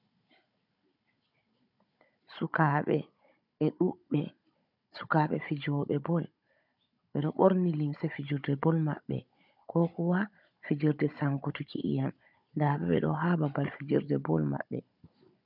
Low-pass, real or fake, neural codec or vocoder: 5.4 kHz; fake; codec, 16 kHz, 16 kbps, FunCodec, trained on Chinese and English, 50 frames a second